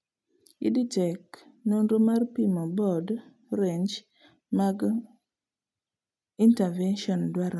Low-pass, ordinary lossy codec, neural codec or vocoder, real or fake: none; none; none; real